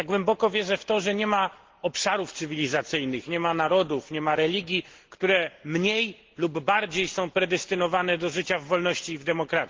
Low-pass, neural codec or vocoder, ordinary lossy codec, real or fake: 7.2 kHz; none; Opus, 16 kbps; real